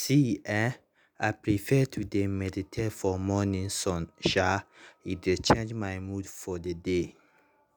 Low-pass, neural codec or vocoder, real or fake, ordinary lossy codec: none; none; real; none